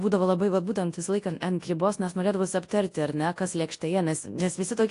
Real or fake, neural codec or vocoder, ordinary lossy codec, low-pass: fake; codec, 24 kHz, 0.9 kbps, WavTokenizer, large speech release; AAC, 48 kbps; 10.8 kHz